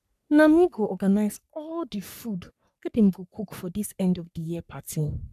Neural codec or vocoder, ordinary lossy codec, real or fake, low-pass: codec, 44.1 kHz, 3.4 kbps, Pupu-Codec; none; fake; 14.4 kHz